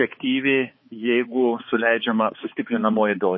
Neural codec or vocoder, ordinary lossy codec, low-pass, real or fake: codec, 16 kHz, 4 kbps, X-Codec, HuBERT features, trained on balanced general audio; MP3, 24 kbps; 7.2 kHz; fake